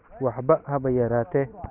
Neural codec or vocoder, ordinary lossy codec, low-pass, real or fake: none; none; 3.6 kHz; real